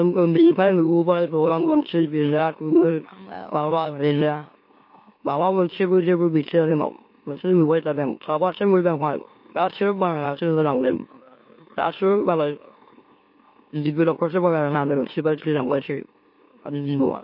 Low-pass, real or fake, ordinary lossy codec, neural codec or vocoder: 5.4 kHz; fake; MP3, 32 kbps; autoencoder, 44.1 kHz, a latent of 192 numbers a frame, MeloTTS